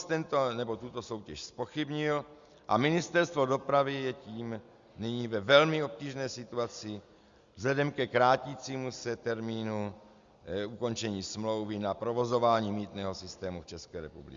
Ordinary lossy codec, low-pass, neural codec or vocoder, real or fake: Opus, 64 kbps; 7.2 kHz; none; real